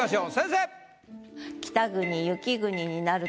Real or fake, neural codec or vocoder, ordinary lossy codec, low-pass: real; none; none; none